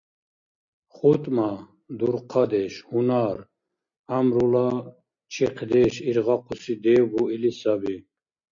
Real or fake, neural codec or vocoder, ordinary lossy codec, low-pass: real; none; MP3, 48 kbps; 7.2 kHz